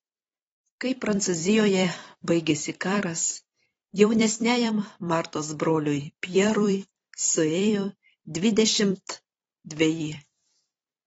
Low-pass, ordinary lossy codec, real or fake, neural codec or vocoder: 19.8 kHz; AAC, 24 kbps; fake; vocoder, 44.1 kHz, 128 mel bands every 256 samples, BigVGAN v2